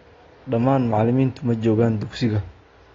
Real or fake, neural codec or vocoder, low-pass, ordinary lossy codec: real; none; 7.2 kHz; AAC, 32 kbps